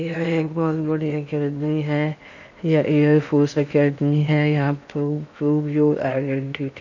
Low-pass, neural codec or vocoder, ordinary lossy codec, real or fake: 7.2 kHz; codec, 16 kHz in and 24 kHz out, 0.8 kbps, FocalCodec, streaming, 65536 codes; none; fake